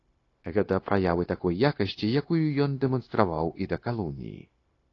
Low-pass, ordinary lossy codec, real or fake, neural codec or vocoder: 7.2 kHz; AAC, 32 kbps; fake; codec, 16 kHz, 0.9 kbps, LongCat-Audio-Codec